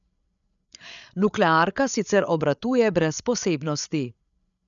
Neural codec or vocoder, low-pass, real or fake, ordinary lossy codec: codec, 16 kHz, 8 kbps, FreqCodec, larger model; 7.2 kHz; fake; none